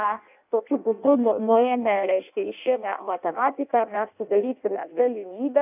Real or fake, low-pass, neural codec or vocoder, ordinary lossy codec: fake; 3.6 kHz; codec, 16 kHz in and 24 kHz out, 0.6 kbps, FireRedTTS-2 codec; AAC, 32 kbps